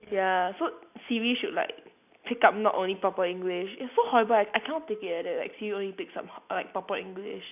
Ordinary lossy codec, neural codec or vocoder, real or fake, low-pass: none; none; real; 3.6 kHz